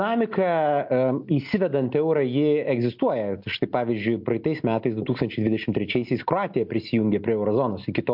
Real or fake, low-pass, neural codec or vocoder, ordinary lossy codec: real; 5.4 kHz; none; MP3, 48 kbps